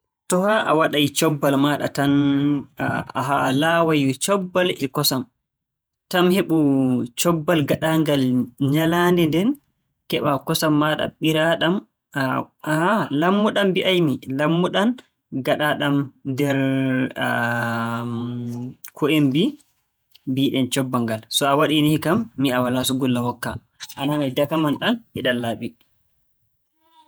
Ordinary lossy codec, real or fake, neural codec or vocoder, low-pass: none; fake; vocoder, 48 kHz, 128 mel bands, Vocos; none